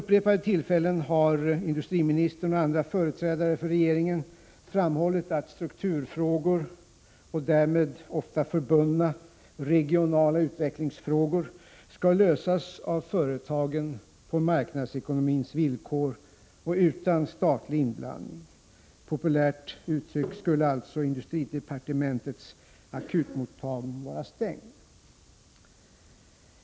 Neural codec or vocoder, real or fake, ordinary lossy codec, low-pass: none; real; none; none